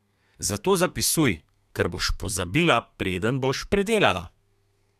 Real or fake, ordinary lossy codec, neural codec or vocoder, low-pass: fake; none; codec, 32 kHz, 1.9 kbps, SNAC; 14.4 kHz